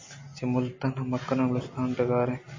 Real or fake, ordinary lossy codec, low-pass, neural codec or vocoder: real; MP3, 32 kbps; 7.2 kHz; none